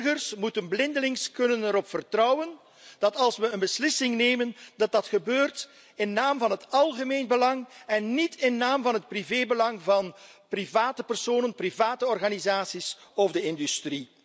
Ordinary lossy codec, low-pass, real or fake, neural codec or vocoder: none; none; real; none